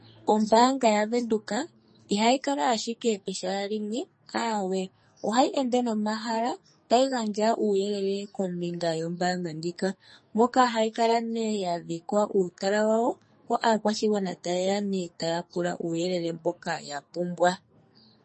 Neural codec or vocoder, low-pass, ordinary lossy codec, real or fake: codec, 44.1 kHz, 2.6 kbps, SNAC; 10.8 kHz; MP3, 32 kbps; fake